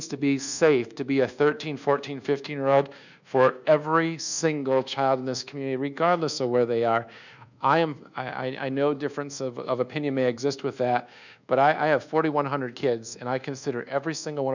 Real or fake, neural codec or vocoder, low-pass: fake; codec, 24 kHz, 1.2 kbps, DualCodec; 7.2 kHz